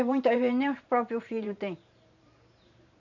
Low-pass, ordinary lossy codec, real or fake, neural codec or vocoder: 7.2 kHz; none; fake; vocoder, 44.1 kHz, 128 mel bands every 512 samples, BigVGAN v2